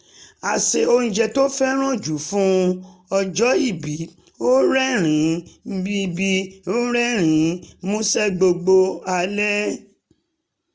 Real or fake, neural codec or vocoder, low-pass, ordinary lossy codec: real; none; none; none